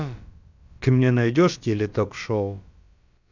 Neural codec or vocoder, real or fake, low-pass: codec, 16 kHz, about 1 kbps, DyCAST, with the encoder's durations; fake; 7.2 kHz